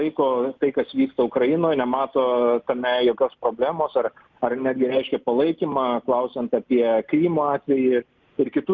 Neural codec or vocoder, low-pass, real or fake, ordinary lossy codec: none; 7.2 kHz; real; Opus, 24 kbps